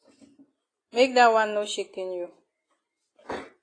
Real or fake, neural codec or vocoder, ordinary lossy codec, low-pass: real; none; AAC, 32 kbps; 9.9 kHz